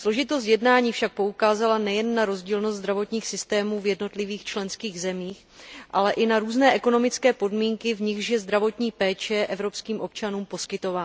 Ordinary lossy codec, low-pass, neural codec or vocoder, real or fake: none; none; none; real